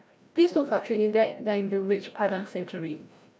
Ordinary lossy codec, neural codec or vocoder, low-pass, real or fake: none; codec, 16 kHz, 0.5 kbps, FreqCodec, larger model; none; fake